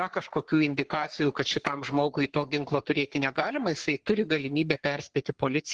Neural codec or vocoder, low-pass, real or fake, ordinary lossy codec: codec, 44.1 kHz, 3.4 kbps, Pupu-Codec; 9.9 kHz; fake; Opus, 16 kbps